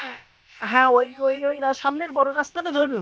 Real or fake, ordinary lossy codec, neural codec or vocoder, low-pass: fake; none; codec, 16 kHz, about 1 kbps, DyCAST, with the encoder's durations; none